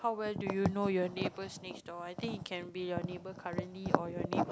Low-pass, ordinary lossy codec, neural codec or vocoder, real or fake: none; none; none; real